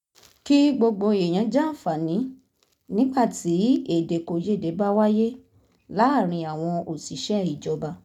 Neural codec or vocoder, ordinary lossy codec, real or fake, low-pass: none; none; real; 19.8 kHz